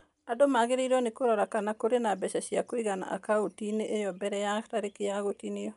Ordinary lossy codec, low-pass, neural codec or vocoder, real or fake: MP3, 96 kbps; 10.8 kHz; none; real